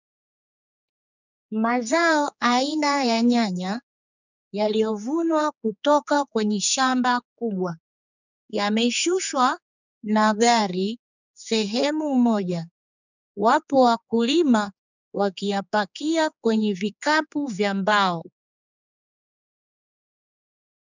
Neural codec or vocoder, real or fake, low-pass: codec, 16 kHz, 4 kbps, X-Codec, HuBERT features, trained on general audio; fake; 7.2 kHz